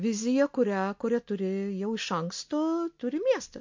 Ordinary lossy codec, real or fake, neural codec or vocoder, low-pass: MP3, 48 kbps; real; none; 7.2 kHz